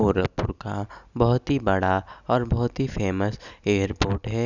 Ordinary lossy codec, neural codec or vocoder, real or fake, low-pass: none; none; real; 7.2 kHz